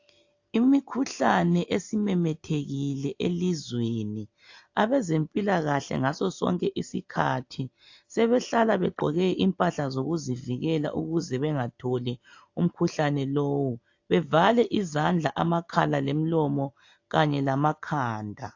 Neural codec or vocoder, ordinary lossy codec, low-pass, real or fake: none; AAC, 48 kbps; 7.2 kHz; real